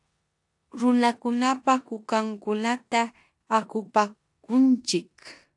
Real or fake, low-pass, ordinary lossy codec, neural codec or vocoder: fake; 10.8 kHz; AAC, 64 kbps; codec, 16 kHz in and 24 kHz out, 0.9 kbps, LongCat-Audio-Codec, four codebook decoder